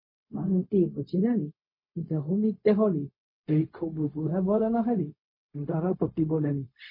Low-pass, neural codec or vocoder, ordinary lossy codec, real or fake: 5.4 kHz; codec, 16 kHz, 0.4 kbps, LongCat-Audio-Codec; MP3, 24 kbps; fake